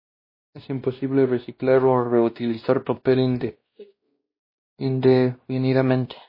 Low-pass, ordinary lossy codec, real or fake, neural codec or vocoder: 5.4 kHz; MP3, 24 kbps; fake; codec, 16 kHz, 1 kbps, X-Codec, WavLM features, trained on Multilingual LibriSpeech